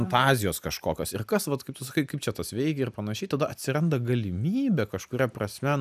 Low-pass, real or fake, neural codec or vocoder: 14.4 kHz; real; none